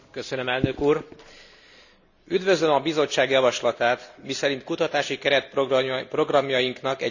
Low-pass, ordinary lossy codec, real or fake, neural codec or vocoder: 7.2 kHz; none; real; none